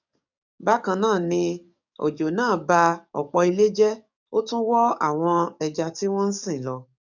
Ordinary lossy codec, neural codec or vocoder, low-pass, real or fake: none; codec, 44.1 kHz, 7.8 kbps, DAC; 7.2 kHz; fake